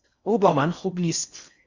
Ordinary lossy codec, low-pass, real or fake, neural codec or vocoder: Opus, 64 kbps; 7.2 kHz; fake; codec, 16 kHz in and 24 kHz out, 0.6 kbps, FocalCodec, streaming, 4096 codes